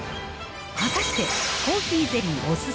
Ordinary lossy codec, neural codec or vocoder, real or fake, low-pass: none; none; real; none